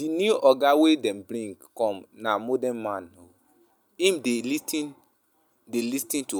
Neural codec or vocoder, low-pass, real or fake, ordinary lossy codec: none; none; real; none